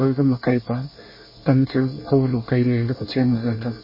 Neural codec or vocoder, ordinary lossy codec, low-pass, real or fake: codec, 24 kHz, 1 kbps, SNAC; MP3, 24 kbps; 5.4 kHz; fake